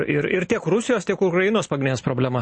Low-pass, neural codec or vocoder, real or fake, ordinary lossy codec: 9.9 kHz; none; real; MP3, 32 kbps